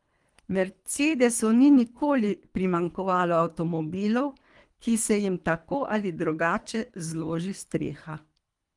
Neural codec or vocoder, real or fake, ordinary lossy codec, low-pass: codec, 24 kHz, 3 kbps, HILCodec; fake; Opus, 32 kbps; 10.8 kHz